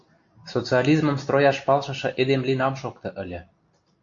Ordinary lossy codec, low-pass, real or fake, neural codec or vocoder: AAC, 48 kbps; 7.2 kHz; real; none